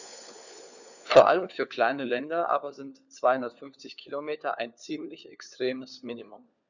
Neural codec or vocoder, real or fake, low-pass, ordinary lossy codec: codec, 16 kHz, 4 kbps, FunCodec, trained on LibriTTS, 50 frames a second; fake; 7.2 kHz; none